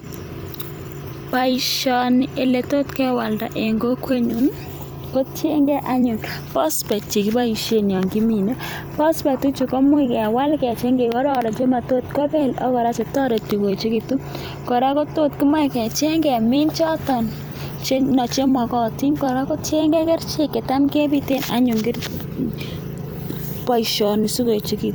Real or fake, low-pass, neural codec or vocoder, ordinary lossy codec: fake; none; vocoder, 44.1 kHz, 128 mel bands every 256 samples, BigVGAN v2; none